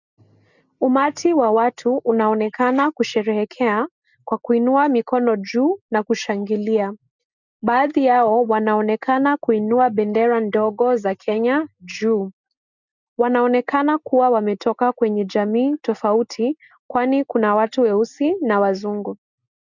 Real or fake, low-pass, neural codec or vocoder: real; 7.2 kHz; none